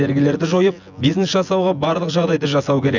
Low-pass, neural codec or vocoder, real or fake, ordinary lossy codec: 7.2 kHz; vocoder, 24 kHz, 100 mel bands, Vocos; fake; none